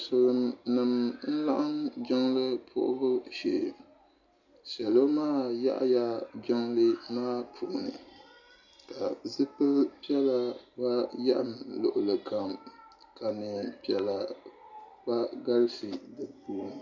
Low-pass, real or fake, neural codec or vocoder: 7.2 kHz; real; none